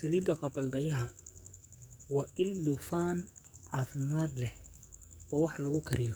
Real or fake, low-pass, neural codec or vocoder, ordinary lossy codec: fake; none; codec, 44.1 kHz, 2.6 kbps, SNAC; none